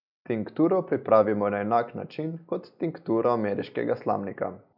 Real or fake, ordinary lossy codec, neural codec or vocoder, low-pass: real; none; none; 5.4 kHz